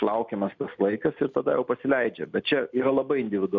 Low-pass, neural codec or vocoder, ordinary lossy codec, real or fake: 7.2 kHz; none; MP3, 64 kbps; real